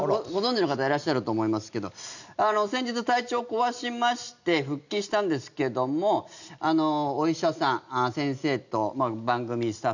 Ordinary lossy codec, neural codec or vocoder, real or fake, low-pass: none; none; real; 7.2 kHz